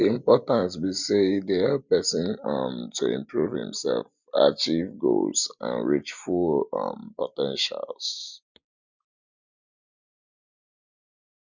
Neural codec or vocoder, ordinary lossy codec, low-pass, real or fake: none; none; 7.2 kHz; real